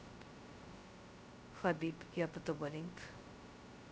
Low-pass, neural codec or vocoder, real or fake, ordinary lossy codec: none; codec, 16 kHz, 0.2 kbps, FocalCodec; fake; none